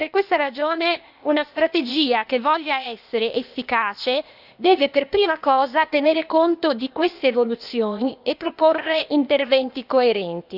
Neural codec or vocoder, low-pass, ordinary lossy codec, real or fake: codec, 16 kHz, 0.8 kbps, ZipCodec; 5.4 kHz; none; fake